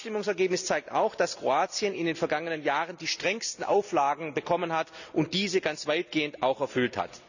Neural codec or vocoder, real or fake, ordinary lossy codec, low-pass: none; real; none; 7.2 kHz